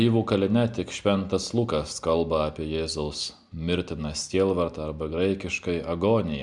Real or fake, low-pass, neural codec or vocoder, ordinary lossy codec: real; 10.8 kHz; none; Opus, 64 kbps